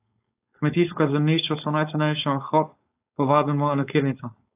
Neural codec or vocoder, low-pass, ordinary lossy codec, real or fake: codec, 16 kHz, 4.8 kbps, FACodec; 3.6 kHz; AAC, 32 kbps; fake